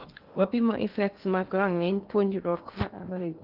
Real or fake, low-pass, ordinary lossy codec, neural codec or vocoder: fake; 5.4 kHz; Opus, 32 kbps; codec, 16 kHz in and 24 kHz out, 0.8 kbps, FocalCodec, streaming, 65536 codes